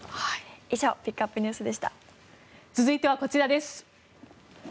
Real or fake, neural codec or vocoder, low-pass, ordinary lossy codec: real; none; none; none